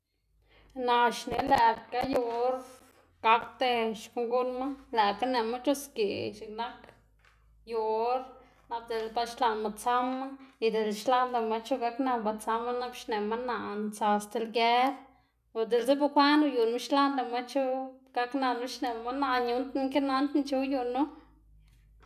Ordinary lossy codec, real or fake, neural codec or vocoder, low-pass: none; real; none; 14.4 kHz